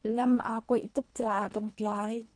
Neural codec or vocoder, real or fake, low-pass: codec, 24 kHz, 1.5 kbps, HILCodec; fake; 9.9 kHz